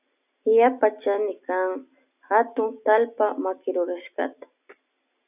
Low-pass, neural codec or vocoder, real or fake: 3.6 kHz; none; real